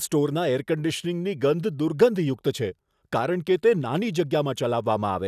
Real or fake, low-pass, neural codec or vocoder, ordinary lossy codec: real; 14.4 kHz; none; none